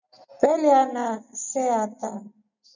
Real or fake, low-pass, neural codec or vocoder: real; 7.2 kHz; none